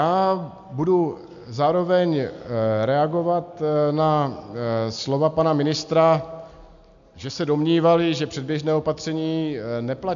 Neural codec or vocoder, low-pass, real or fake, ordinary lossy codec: none; 7.2 kHz; real; MP3, 64 kbps